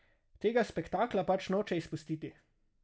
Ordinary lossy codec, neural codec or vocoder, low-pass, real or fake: none; none; none; real